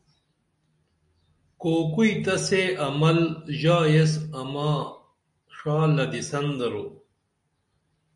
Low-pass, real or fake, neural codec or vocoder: 10.8 kHz; real; none